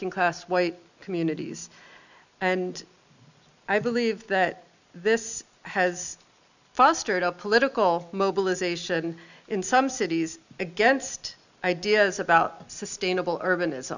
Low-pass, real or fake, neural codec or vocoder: 7.2 kHz; real; none